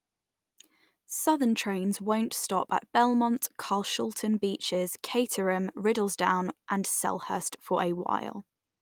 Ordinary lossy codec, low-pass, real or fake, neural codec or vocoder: Opus, 32 kbps; 19.8 kHz; real; none